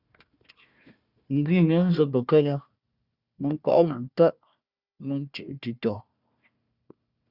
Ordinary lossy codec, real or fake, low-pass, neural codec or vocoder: Opus, 64 kbps; fake; 5.4 kHz; codec, 16 kHz, 1 kbps, FunCodec, trained on Chinese and English, 50 frames a second